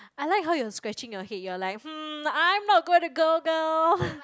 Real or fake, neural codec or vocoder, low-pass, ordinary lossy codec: real; none; none; none